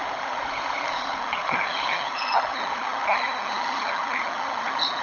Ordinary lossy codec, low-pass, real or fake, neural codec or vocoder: none; 7.2 kHz; fake; codec, 16 kHz, 16 kbps, FunCodec, trained on LibriTTS, 50 frames a second